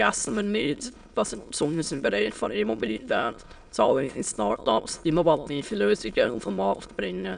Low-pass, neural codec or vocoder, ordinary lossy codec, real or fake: 9.9 kHz; autoencoder, 22.05 kHz, a latent of 192 numbers a frame, VITS, trained on many speakers; none; fake